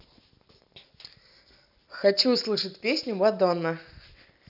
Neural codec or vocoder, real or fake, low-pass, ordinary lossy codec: none; real; 5.4 kHz; none